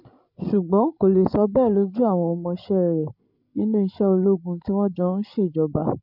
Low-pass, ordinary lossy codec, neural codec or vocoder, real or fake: 5.4 kHz; none; codec, 16 kHz, 16 kbps, FreqCodec, larger model; fake